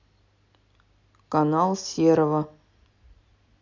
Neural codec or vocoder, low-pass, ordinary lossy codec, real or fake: none; 7.2 kHz; none; real